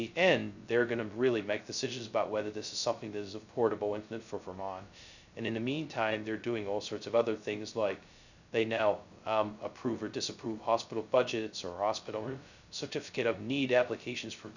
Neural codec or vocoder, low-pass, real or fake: codec, 16 kHz, 0.2 kbps, FocalCodec; 7.2 kHz; fake